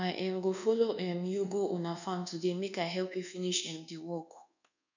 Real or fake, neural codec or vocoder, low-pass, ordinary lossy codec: fake; codec, 24 kHz, 1.2 kbps, DualCodec; 7.2 kHz; none